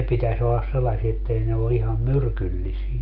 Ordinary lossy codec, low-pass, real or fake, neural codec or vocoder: Opus, 32 kbps; 5.4 kHz; real; none